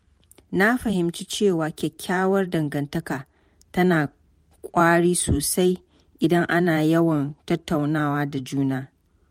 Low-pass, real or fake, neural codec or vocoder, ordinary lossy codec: 19.8 kHz; fake; vocoder, 44.1 kHz, 128 mel bands every 512 samples, BigVGAN v2; MP3, 64 kbps